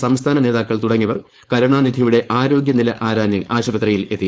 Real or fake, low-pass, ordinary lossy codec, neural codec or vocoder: fake; none; none; codec, 16 kHz, 4.8 kbps, FACodec